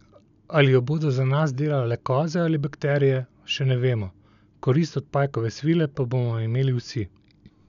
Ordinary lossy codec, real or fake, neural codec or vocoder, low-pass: none; real; none; 7.2 kHz